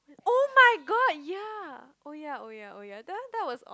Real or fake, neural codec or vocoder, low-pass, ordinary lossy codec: real; none; none; none